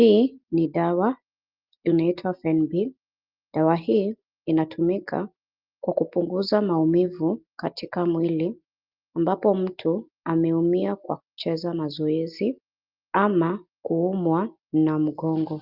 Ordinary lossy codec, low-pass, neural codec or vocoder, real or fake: Opus, 32 kbps; 5.4 kHz; none; real